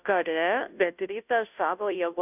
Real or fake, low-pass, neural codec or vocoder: fake; 3.6 kHz; codec, 16 kHz, 0.5 kbps, FunCodec, trained on Chinese and English, 25 frames a second